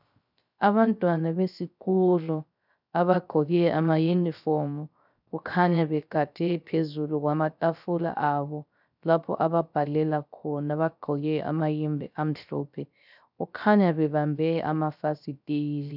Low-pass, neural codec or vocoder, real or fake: 5.4 kHz; codec, 16 kHz, 0.3 kbps, FocalCodec; fake